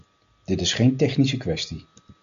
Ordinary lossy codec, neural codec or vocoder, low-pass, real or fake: MP3, 96 kbps; none; 7.2 kHz; real